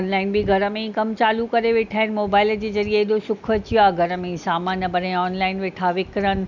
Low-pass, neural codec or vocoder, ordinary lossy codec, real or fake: 7.2 kHz; none; none; real